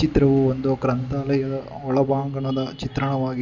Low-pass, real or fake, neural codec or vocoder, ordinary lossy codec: 7.2 kHz; real; none; none